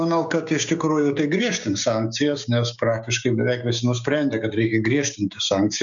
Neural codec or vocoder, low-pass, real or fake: codec, 16 kHz, 6 kbps, DAC; 7.2 kHz; fake